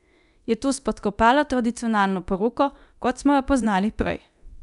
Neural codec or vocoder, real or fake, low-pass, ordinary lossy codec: codec, 24 kHz, 0.9 kbps, DualCodec; fake; 10.8 kHz; none